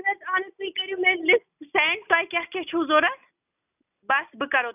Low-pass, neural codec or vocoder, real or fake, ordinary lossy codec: 3.6 kHz; none; real; none